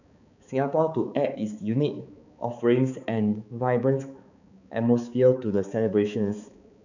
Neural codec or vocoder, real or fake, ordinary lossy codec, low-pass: codec, 16 kHz, 4 kbps, X-Codec, HuBERT features, trained on balanced general audio; fake; none; 7.2 kHz